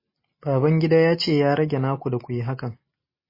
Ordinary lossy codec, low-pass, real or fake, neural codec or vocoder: MP3, 24 kbps; 5.4 kHz; real; none